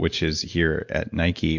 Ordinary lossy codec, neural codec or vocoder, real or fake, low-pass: MP3, 48 kbps; none; real; 7.2 kHz